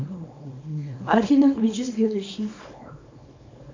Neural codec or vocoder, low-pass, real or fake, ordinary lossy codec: codec, 24 kHz, 0.9 kbps, WavTokenizer, small release; 7.2 kHz; fake; MP3, 64 kbps